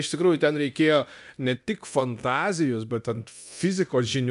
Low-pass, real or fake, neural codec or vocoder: 10.8 kHz; fake; codec, 24 kHz, 0.9 kbps, DualCodec